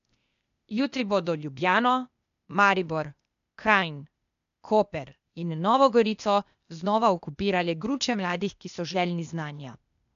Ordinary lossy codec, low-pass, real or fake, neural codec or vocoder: MP3, 96 kbps; 7.2 kHz; fake; codec, 16 kHz, 0.8 kbps, ZipCodec